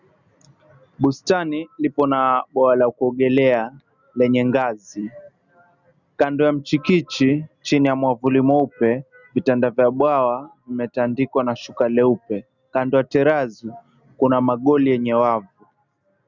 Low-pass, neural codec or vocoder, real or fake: 7.2 kHz; none; real